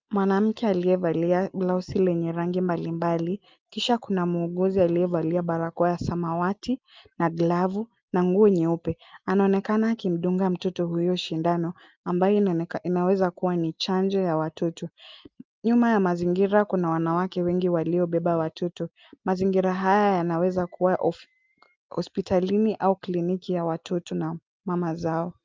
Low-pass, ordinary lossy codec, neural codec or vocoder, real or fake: 7.2 kHz; Opus, 24 kbps; none; real